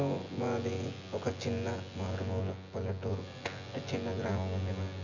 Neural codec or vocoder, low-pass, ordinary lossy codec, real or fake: vocoder, 24 kHz, 100 mel bands, Vocos; 7.2 kHz; none; fake